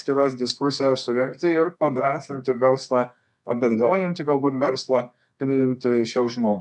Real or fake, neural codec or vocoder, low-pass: fake; codec, 24 kHz, 0.9 kbps, WavTokenizer, medium music audio release; 10.8 kHz